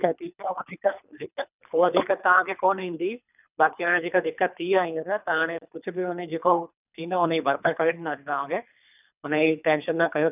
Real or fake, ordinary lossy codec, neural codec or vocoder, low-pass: fake; none; codec, 24 kHz, 3 kbps, HILCodec; 3.6 kHz